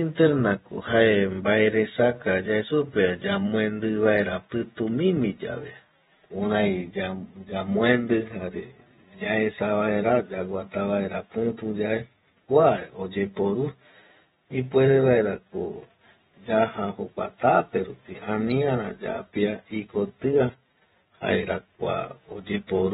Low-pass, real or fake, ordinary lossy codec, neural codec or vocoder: 19.8 kHz; fake; AAC, 16 kbps; vocoder, 48 kHz, 128 mel bands, Vocos